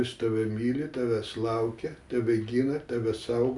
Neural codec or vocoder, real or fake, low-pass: none; real; 10.8 kHz